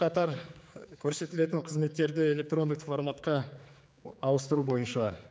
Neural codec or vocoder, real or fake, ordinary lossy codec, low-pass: codec, 16 kHz, 4 kbps, X-Codec, HuBERT features, trained on general audio; fake; none; none